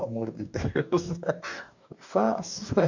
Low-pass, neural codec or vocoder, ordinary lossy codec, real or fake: 7.2 kHz; codec, 44.1 kHz, 2.6 kbps, DAC; none; fake